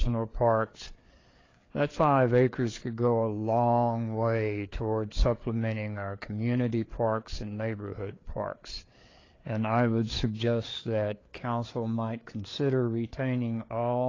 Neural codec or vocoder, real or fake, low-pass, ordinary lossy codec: codec, 16 kHz, 4 kbps, FreqCodec, larger model; fake; 7.2 kHz; AAC, 32 kbps